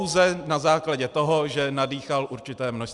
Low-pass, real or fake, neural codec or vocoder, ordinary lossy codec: 10.8 kHz; real; none; Opus, 64 kbps